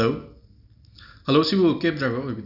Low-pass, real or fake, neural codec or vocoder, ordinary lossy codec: 5.4 kHz; real; none; none